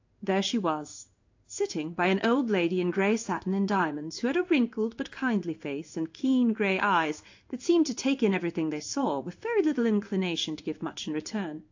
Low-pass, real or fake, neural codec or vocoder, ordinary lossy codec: 7.2 kHz; fake; codec, 16 kHz in and 24 kHz out, 1 kbps, XY-Tokenizer; AAC, 48 kbps